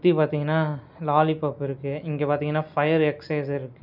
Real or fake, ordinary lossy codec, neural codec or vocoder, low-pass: real; none; none; 5.4 kHz